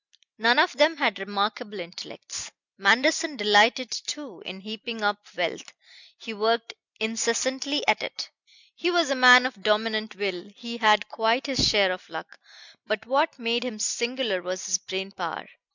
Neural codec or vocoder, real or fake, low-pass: none; real; 7.2 kHz